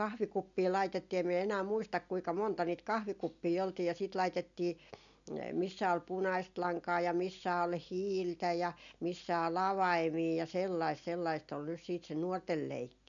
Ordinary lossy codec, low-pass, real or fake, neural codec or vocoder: none; 7.2 kHz; real; none